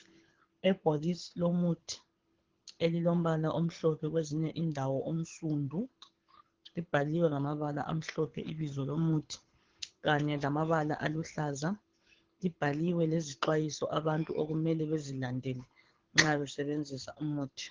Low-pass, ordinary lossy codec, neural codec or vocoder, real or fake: 7.2 kHz; Opus, 16 kbps; codec, 24 kHz, 6 kbps, HILCodec; fake